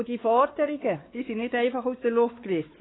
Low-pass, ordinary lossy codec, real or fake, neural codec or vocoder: 7.2 kHz; AAC, 16 kbps; fake; codec, 16 kHz, 4 kbps, X-Codec, WavLM features, trained on Multilingual LibriSpeech